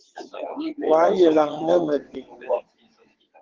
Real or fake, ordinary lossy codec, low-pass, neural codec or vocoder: fake; Opus, 16 kbps; 7.2 kHz; vocoder, 22.05 kHz, 80 mel bands, WaveNeXt